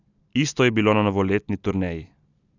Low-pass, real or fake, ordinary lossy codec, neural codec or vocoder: 7.2 kHz; real; none; none